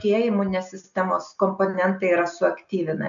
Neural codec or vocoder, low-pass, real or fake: none; 7.2 kHz; real